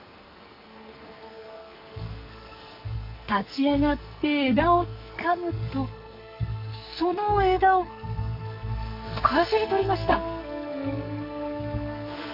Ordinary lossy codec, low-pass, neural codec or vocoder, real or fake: none; 5.4 kHz; codec, 44.1 kHz, 2.6 kbps, SNAC; fake